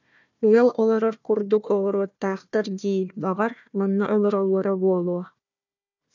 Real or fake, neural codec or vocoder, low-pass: fake; codec, 16 kHz, 1 kbps, FunCodec, trained on Chinese and English, 50 frames a second; 7.2 kHz